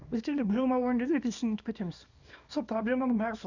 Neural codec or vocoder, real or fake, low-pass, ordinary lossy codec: codec, 24 kHz, 0.9 kbps, WavTokenizer, small release; fake; 7.2 kHz; none